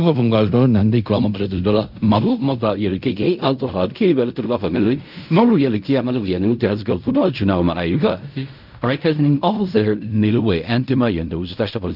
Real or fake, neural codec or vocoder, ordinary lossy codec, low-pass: fake; codec, 16 kHz in and 24 kHz out, 0.4 kbps, LongCat-Audio-Codec, fine tuned four codebook decoder; none; 5.4 kHz